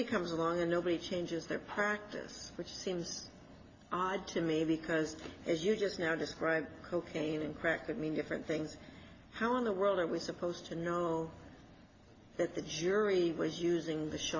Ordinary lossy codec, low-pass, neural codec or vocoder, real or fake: AAC, 32 kbps; 7.2 kHz; none; real